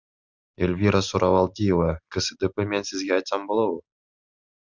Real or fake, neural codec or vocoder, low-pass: real; none; 7.2 kHz